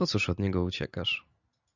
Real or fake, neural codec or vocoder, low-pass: real; none; 7.2 kHz